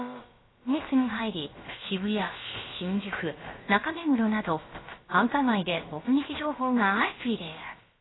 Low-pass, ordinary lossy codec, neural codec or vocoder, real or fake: 7.2 kHz; AAC, 16 kbps; codec, 16 kHz, about 1 kbps, DyCAST, with the encoder's durations; fake